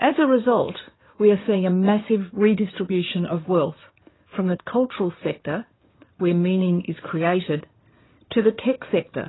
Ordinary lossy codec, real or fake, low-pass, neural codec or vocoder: AAC, 16 kbps; fake; 7.2 kHz; codec, 16 kHz in and 24 kHz out, 2.2 kbps, FireRedTTS-2 codec